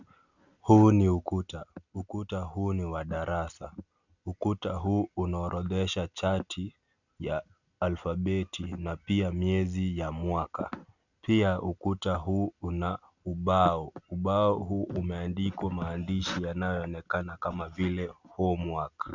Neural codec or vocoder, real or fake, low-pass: none; real; 7.2 kHz